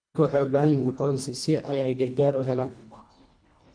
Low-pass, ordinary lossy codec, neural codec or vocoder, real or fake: 9.9 kHz; MP3, 64 kbps; codec, 24 kHz, 1.5 kbps, HILCodec; fake